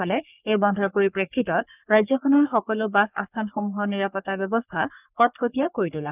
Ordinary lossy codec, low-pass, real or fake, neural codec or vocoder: none; 3.6 kHz; fake; codec, 44.1 kHz, 3.4 kbps, Pupu-Codec